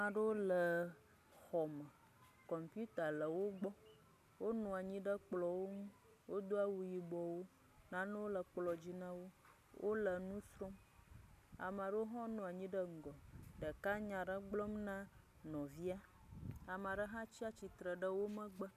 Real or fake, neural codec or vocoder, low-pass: real; none; 14.4 kHz